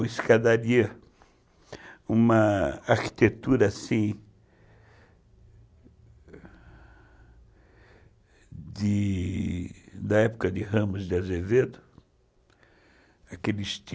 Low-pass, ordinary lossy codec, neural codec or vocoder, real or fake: none; none; none; real